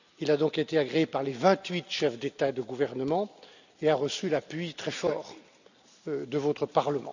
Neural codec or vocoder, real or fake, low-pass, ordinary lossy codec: none; real; 7.2 kHz; none